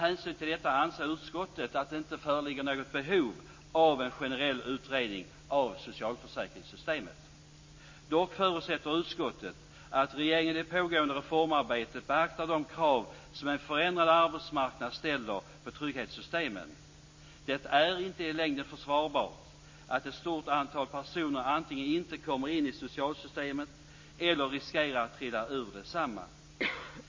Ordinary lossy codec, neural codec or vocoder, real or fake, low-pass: MP3, 32 kbps; none; real; 7.2 kHz